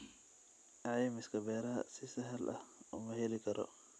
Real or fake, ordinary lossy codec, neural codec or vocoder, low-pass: real; none; none; none